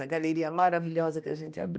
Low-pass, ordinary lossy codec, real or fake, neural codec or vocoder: none; none; fake; codec, 16 kHz, 1 kbps, X-Codec, HuBERT features, trained on balanced general audio